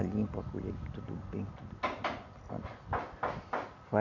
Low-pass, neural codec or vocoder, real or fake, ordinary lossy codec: 7.2 kHz; none; real; none